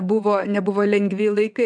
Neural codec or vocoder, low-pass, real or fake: vocoder, 22.05 kHz, 80 mel bands, WaveNeXt; 9.9 kHz; fake